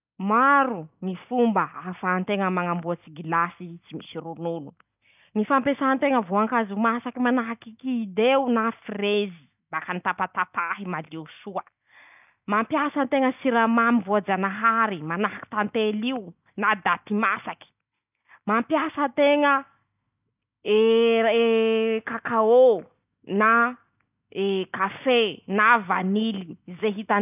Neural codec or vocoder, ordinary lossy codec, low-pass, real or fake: none; none; 3.6 kHz; real